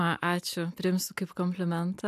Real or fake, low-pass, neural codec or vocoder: real; 14.4 kHz; none